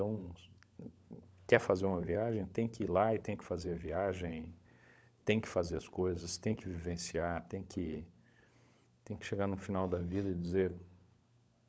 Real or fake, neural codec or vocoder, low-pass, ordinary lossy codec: fake; codec, 16 kHz, 16 kbps, FunCodec, trained on LibriTTS, 50 frames a second; none; none